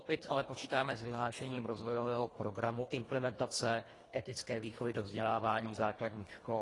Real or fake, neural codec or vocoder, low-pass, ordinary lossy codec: fake; codec, 24 kHz, 1.5 kbps, HILCodec; 10.8 kHz; AAC, 32 kbps